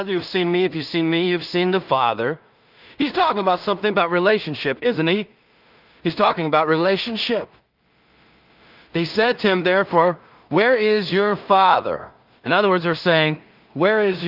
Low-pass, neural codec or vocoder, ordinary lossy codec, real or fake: 5.4 kHz; codec, 16 kHz in and 24 kHz out, 0.4 kbps, LongCat-Audio-Codec, two codebook decoder; Opus, 24 kbps; fake